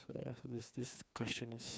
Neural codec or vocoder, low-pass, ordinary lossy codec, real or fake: codec, 16 kHz, 2 kbps, FreqCodec, larger model; none; none; fake